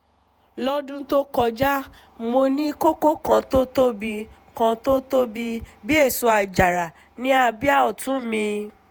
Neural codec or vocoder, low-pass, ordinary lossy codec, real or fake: vocoder, 48 kHz, 128 mel bands, Vocos; none; none; fake